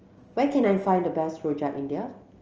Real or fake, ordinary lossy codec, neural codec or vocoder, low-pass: real; Opus, 24 kbps; none; 7.2 kHz